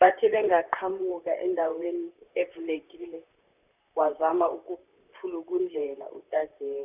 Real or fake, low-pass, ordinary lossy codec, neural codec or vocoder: fake; 3.6 kHz; none; vocoder, 44.1 kHz, 128 mel bands, Pupu-Vocoder